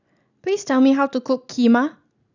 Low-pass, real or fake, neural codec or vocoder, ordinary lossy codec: 7.2 kHz; real; none; none